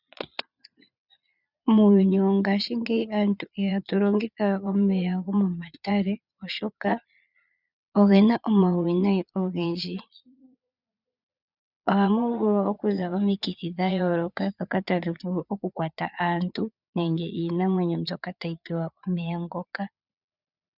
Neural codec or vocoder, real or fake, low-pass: vocoder, 22.05 kHz, 80 mel bands, Vocos; fake; 5.4 kHz